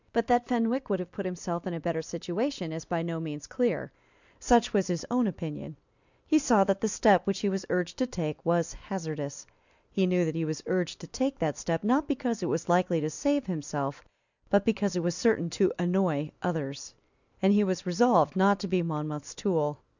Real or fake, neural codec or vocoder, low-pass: real; none; 7.2 kHz